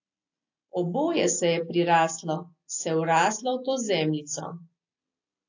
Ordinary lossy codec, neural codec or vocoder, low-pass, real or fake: AAC, 48 kbps; none; 7.2 kHz; real